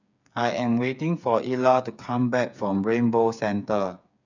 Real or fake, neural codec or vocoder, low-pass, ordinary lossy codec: fake; codec, 16 kHz, 8 kbps, FreqCodec, smaller model; 7.2 kHz; none